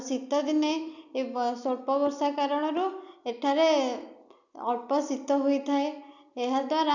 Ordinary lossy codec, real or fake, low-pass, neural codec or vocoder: none; real; 7.2 kHz; none